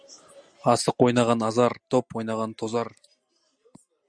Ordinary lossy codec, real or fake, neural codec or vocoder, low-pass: Opus, 64 kbps; real; none; 9.9 kHz